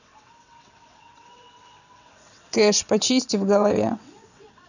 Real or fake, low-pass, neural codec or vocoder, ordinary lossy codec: fake; 7.2 kHz; vocoder, 22.05 kHz, 80 mel bands, WaveNeXt; none